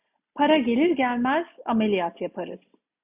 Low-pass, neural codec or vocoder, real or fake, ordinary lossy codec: 3.6 kHz; none; real; AAC, 32 kbps